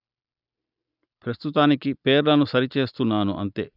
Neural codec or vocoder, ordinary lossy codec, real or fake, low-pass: none; none; real; 5.4 kHz